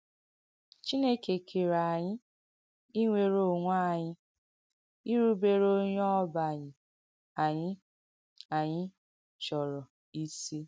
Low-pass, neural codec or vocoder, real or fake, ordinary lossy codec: none; none; real; none